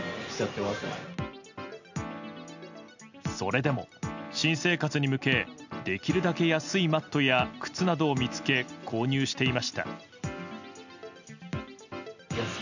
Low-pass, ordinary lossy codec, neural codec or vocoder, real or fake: 7.2 kHz; none; none; real